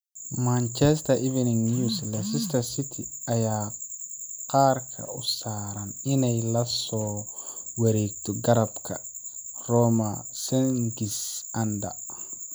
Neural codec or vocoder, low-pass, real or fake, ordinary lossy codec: none; none; real; none